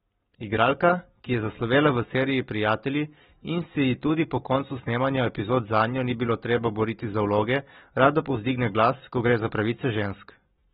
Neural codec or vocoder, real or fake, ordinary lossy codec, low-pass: none; real; AAC, 16 kbps; 7.2 kHz